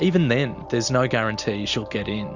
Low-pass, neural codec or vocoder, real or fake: 7.2 kHz; none; real